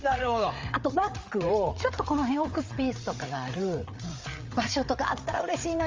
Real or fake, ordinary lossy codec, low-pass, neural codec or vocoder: fake; Opus, 32 kbps; 7.2 kHz; codec, 16 kHz, 8 kbps, FreqCodec, larger model